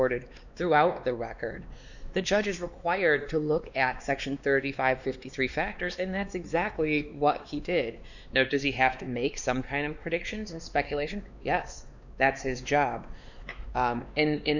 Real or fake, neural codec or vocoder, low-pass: fake; codec, 16 kHz, 2 kbps, X-Codec, WavLM features, trained on Multilingual LibriSpeech; 7.2 kHz